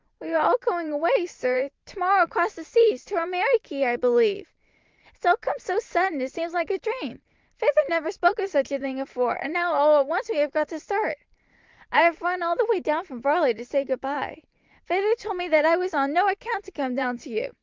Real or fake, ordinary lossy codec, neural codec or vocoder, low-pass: fake; Opus, 24 kbps; vocoder, 44.1 kHz, 128 mel bands every 512 samples, BigVGAN v2; 7.2 kHz